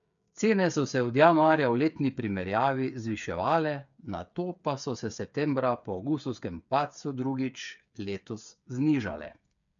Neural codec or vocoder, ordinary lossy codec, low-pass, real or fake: codec, 16 kHz, 8 kbps, FreqCodec, smaller model; none; 7.2 kHz; fake